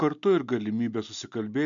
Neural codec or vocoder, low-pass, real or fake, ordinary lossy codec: none; 7.2 kHz; real; MP3, 64 kbps